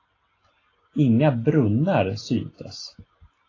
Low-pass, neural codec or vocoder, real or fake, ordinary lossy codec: 7.2 kHz; none; real; AAC, 32 kbps